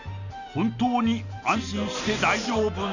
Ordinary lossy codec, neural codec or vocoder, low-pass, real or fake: none; none; 7.2 kHz; real